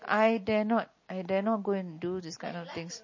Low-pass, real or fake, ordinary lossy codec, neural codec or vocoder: 7.2 kHz; real; MP3, 32 kbps; none